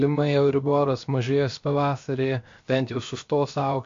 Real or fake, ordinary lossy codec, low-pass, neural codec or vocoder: fake; MP3, 48 kbps; 7.2 kHz; codec, 16 kHz, about 1 kbps, DyCAST, with the encoder's durations